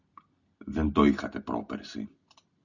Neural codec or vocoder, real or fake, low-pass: none; real; 7.2 kHz